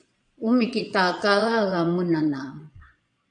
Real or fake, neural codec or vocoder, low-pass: fake; vocoder, 22.05 kHz, 80 mel bands, Vocos; 9.9 kHz